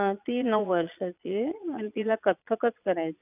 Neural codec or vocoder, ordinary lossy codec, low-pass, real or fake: vocoder, 22.05 kHz, 80 mel bands, Vocos; none; 3.6 kHz; fake